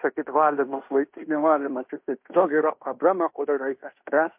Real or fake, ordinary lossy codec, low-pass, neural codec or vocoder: fake; MP3, 32 kbps; 3.6 kHz; codec, 16 kHz in and 24 kHz out, 0.9 kbps, LongCat-Audio-Codec, fine tuned four codebook decoder